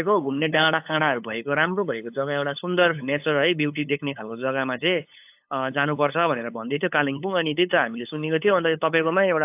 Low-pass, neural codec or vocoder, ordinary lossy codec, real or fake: 3.6 kHz; codec, 16 kHz, 8 kbps, FunCodec, trained on LibriTTS, 25 frames a second; AAC, 32 kbps; fake